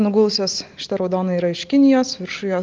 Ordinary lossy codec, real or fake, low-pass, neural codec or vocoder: Opus, 24 kbps; real; 7.2 kHz; none